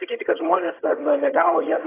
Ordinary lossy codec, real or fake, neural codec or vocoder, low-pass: AAC, 16 kbps; fake; vocoder, 22.05 kHz, 80 mel bands, HiFi-GAN; 3.6 kHz